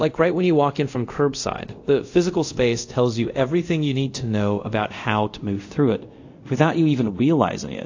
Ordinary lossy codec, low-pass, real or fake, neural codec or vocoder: AAC, 48 kbps; 7.2 kHz; fake; codec, 24 kHz, 0.5 kbps, DualCodec